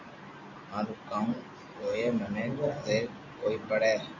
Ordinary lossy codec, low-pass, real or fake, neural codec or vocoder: MP3, 64 kbps; 7.2 kHz; real; none